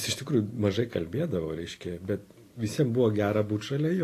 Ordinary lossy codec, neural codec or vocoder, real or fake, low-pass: AAC, 48 kbps; none; real; 14.4 kHz